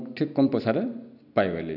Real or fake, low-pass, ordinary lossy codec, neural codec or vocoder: real; 5.4 kHz; none; none